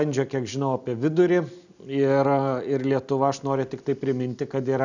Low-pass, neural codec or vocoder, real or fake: 7.2 kHz; none; real